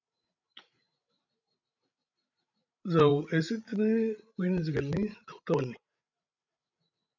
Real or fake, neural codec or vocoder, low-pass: fake; codec, 16 kHz, 16 kbps, FreqCodec, larger model; 7.2 kHz